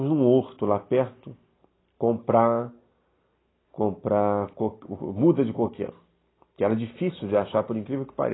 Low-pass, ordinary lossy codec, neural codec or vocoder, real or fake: 7.2 kHz; AAC, 16 kbps; none; real